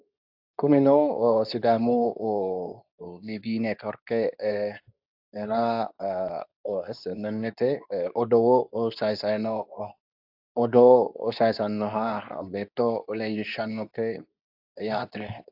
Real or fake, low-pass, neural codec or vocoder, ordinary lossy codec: fake; 5.4 kHz; codec, 24 kHz, 0.9 kbps, WavTokenizer, medium speech release version 2; AAC, 48 kbps